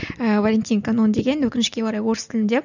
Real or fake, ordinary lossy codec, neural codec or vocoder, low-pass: real; none; none; 7.2 kHz